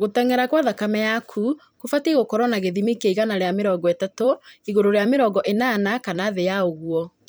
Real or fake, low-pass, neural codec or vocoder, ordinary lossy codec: fake; none; vocoder, 44.1 kHz, 128 mel bands every 256 samples, BigVGAN v2; none